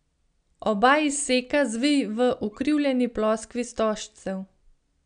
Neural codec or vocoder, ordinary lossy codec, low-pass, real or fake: none; none; 9.9 kHz; real